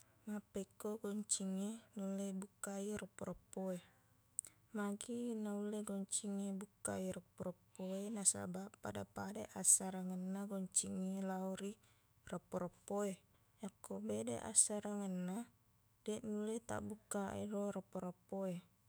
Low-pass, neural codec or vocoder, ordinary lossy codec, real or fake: none; autoencoder, 48 kHz, 128 numbers a frame, DAC-VAE, trained on Japanese speech; none; fake